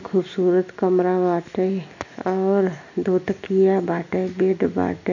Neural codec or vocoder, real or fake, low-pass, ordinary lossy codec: none; real; 7.2 kHz; none